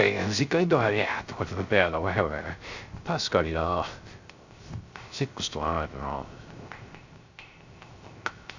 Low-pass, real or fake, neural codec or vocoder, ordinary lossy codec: 7.2 kHz; fake; codec, 16 kHz, 0.3 kbps, FocalCodec; Opus, 64 kbps